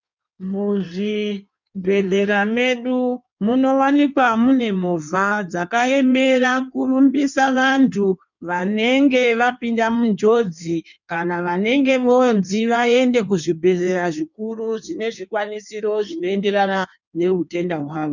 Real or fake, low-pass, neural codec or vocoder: fake; 7.2 kHz; codec, 16 kHz in and 24 kHz out, 1.1 kbps, FireRedTTS-2 codec